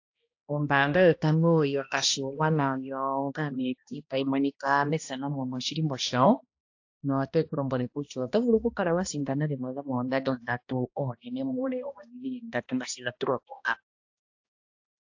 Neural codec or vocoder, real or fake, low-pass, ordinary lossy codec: codec, 16 kHz, 1 kbps, X-Codec, HuBERT features, trained on balanced general audio; fake; 7.2 kHz; AAC, 48 kbps